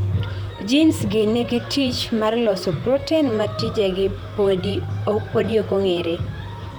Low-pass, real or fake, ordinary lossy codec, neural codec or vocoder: none; fake; none; vocoder, 44.1 kHz, 128 mel bands, Pupu-Vocoder